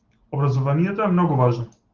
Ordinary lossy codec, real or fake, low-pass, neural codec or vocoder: Opus, 16 kbps; real; 7.2 kHz; none